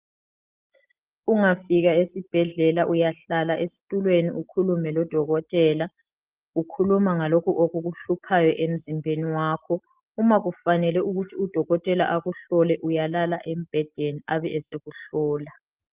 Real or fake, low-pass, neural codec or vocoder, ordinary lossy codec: real; 3.6 kHz; none; Opus, 32 kbps